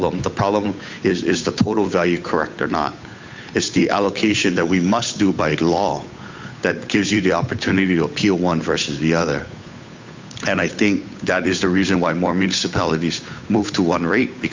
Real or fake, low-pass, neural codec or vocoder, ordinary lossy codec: fake; 7.2 kHz; codec, 16 kHz, 8 kbps, FunCodec, trained on Chinese and English, 25 frames a second; MP3, 64 kbps